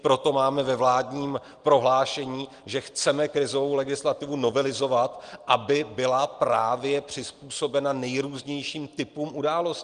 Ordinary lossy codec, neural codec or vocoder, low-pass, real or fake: Opus, 24 kbps; none; 9.9 kHz; real